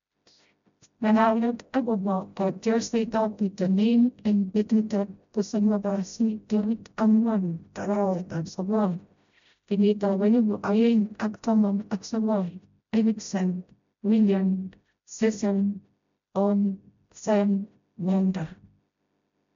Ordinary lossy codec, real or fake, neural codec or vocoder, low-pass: MP3, 64 kbps; fake; codec, 16 kHz, 0.5 kbps, FreqCodec, smaller model; 7.2 kHz